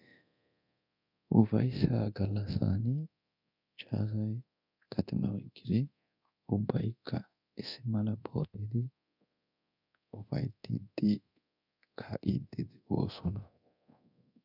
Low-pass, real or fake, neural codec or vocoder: 5.4 kHz; fake; codec, 24 kHz, 0.9 kbps, DualCodec